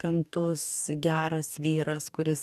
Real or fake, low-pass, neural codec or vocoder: fake; 14.4 kHz; codec, 44.1 kHz, 2.6 kbps, DAC